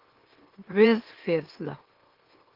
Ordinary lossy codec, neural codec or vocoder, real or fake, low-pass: Opus, 16 kbps; autoencoder, 44.1 kHz, a latent of 192 numbers a frame, MeloTTS; fake; 5.4 kHz